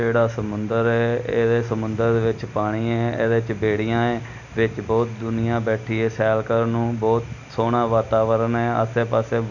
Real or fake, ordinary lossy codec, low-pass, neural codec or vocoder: real; none; 7.2 kHz; none